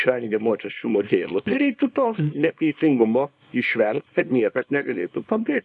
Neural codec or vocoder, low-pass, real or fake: codec, 24 kHz, 0.9 kbps, WavTokenizer, small release; 10.8 kHz; fake